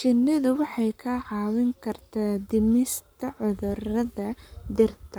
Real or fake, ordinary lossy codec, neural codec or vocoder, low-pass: fake; none; codec, 44.1 kHz, 7.8 kbps, Pupu-Codec; none